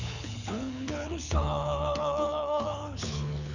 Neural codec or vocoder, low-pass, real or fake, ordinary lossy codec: codec, 24 kHz, 6 kbps, HILCodec; 7.2 kHz; fake; none